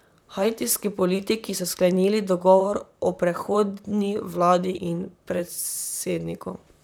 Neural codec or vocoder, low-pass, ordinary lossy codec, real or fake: vocoder, 44.1 kHz, 128 mel bands, Pupu-Vocoder; none; none; fake